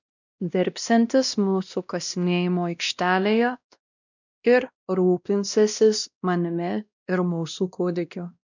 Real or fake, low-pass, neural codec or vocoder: fake; 7.2 kHz; codec, 16 kHz, 1 kbps, X-Codec, WavLM features, trained on Multilingual LibriSpeech